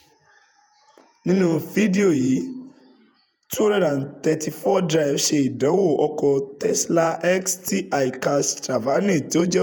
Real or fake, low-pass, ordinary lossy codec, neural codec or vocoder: fake; none; none; vocoder, 48 kHz, 128 mel bands, Vocos